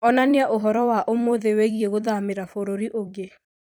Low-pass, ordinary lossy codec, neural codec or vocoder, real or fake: none; none; vocoder, 44.1 kHz, 128 mel bands every 256 samples, BigVGAN v2; fake